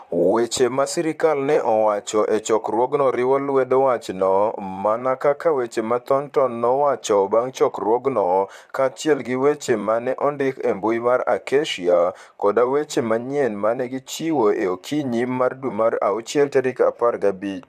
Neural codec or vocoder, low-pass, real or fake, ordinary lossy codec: vocoder, 44.1 kHz, 128 mel bands, Pupu-Vocoder; 14.4 kHz; fake; AAC, 96 kbps